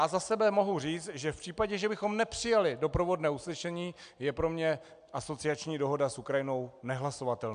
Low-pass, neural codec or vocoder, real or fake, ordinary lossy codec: 9.9 kHz; none; real; AAC, 96 kbps